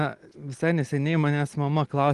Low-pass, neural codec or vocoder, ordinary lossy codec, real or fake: 10.8 kHz; none; Opus, 24 kbps; real